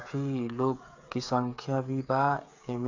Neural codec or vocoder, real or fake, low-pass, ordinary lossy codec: codec, 16 kHz, 8 kbps, FreqCodec, smaller model; fake; 7.2 kHz; AAC, 48 kbps